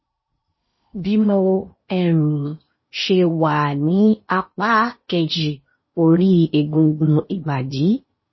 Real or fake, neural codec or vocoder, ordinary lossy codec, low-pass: fake; codec, 16 kHz in and 24 kHz out, 0.8 kbps, FocalCodec, streaming, 65536 codes; MP3, 24 kbps; 7.2 kHz